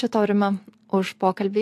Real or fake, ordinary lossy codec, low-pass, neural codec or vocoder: real; AAC, 64 kbps; 14.4 kHz; none